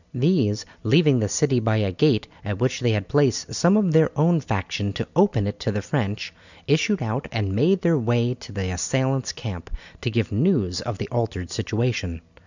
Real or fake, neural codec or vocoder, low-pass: real; none; 7.2 kHz